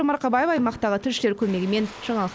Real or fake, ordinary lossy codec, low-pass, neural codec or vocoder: real; none; none; none